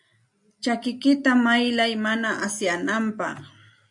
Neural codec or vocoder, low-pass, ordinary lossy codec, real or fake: none; 10.8 kHz; MP3, 64 kbps; real